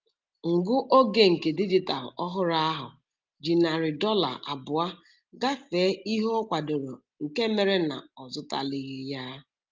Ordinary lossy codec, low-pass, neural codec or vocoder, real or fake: Opus, 32 kbps; 7.2 kHz; none; real